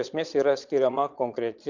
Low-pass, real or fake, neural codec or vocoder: 7.2 kHz; fake; vocoder, 22.05 kHz, 80 mel bands, Vocos